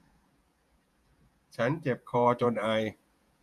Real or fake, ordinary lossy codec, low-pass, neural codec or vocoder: fake; none; 14.4 kHz; vocoder, 48 kHz, 128 mel bands, Vocos